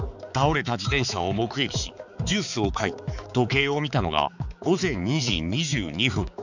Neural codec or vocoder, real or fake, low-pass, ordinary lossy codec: codec, 16 kHz, 4 kbps, X-Codec, HuBERT features, trained on balanced general audio; fake; 7.2 kHz; none